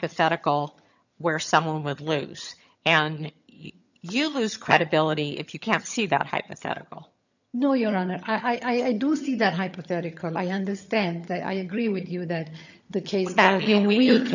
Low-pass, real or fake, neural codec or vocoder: 7.2 kHz; fake; vocoder, 22.05 kHz, 80 mel bands, HiFi-GAN